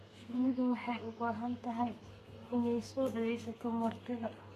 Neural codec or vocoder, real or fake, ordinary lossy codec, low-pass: codec, 32 kHz, 1.9 kbps, SNAC; fake; AAC, 48 kbps; 14.4 kHz